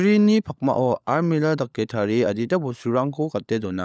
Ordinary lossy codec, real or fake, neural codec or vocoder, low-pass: none; fake; codec, 16 kHz, 16 kbps, FunCodec, trained on LibriTTS, 50 frames a second; none